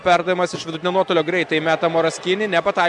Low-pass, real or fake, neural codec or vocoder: 10.8 kHz; real; none